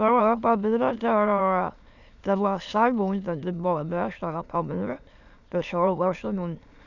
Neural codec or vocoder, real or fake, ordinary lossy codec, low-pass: autoencoder, 22.05 kHz, a latent of 192 numbers a frame, VITS, trained on many speakers; fake; none; 7.2 kHz